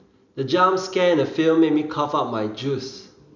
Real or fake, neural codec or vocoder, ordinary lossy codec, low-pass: real; none; none; 7.2 kHz